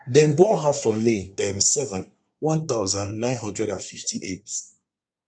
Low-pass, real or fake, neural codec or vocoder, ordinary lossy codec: 9.9 kHz; fake; codec, 24 kHz, 1 kbps, SNAC; none